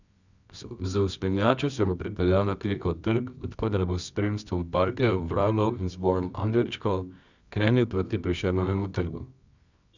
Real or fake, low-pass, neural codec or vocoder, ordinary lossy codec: fake; 7.2 kHz; codec, 24 kHz, 0.9 kbps, WavTokenizer, medium music audio release; none